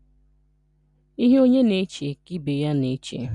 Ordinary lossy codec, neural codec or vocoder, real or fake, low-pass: none; none; real; 10.8 kHz